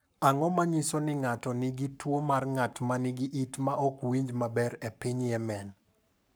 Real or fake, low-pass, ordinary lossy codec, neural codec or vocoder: fake; none; none; codec, 44.1 kHz, 7.8 kbps, Pupu-Codec